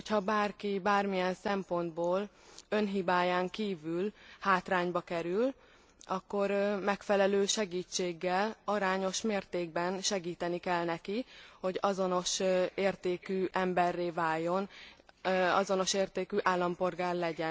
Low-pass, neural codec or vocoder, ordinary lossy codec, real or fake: none; none; none; real